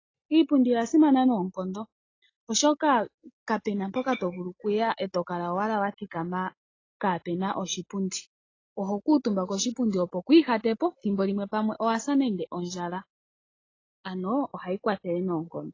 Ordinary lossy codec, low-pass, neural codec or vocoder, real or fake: AAC, 32 kbps; 7.2 kHz; none; real